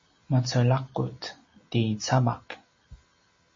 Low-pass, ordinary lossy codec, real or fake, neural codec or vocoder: 7.2 kHz; MP3, 32 kbps; real; none